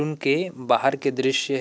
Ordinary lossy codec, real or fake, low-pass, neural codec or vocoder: none; real; none; none